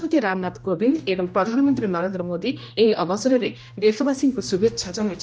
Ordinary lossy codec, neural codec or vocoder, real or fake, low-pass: none; codec, 16 kHz, 1 kbps, X-Codec, HuBERT features, trained on general audio; fake; none